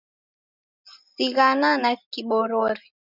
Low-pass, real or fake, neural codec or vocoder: 5.4 kHz; real; none